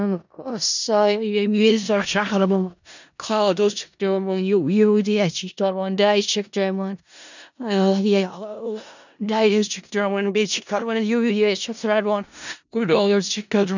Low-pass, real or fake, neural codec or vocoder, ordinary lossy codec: 7.2 kHz; fake; codec, 16 kHz in and 24 kHz out, 0.4 kbps, LongCat-Audio-Codec, four codebook decoder; none